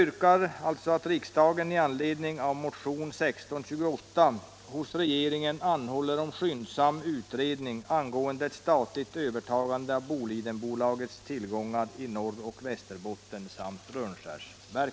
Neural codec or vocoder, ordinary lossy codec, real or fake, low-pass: none; none; real; none